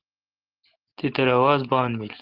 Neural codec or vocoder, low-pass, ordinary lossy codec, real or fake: none; 5.4 kHz; Opus, 16 kbps; real